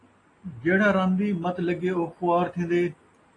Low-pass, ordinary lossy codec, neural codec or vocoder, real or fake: 10.8 kHz; AAC, 32 kbps; none; real